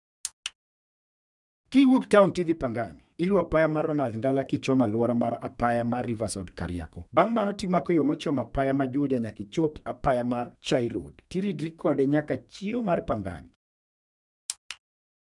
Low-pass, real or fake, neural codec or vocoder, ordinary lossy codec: 10.8 kHz; fake; codec, 32 kHz, 1.9 kbps, SNAC; none